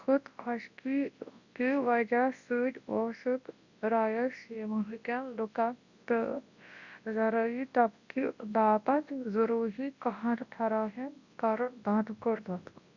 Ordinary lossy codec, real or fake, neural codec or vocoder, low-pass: Opus, 64 kbps; fake; codec, 24 kHz, 0.9 kbps, WavTokenizer, large speech release; 7.2 kHz